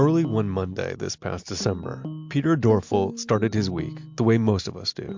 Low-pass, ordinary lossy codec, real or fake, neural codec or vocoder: 7.2 kHz; MP3, 64 kbps; real; none